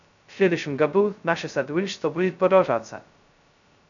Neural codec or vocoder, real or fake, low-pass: codec, 16 kHz, 0.2 kbps, FocalCodec; fake; 7.2 kHz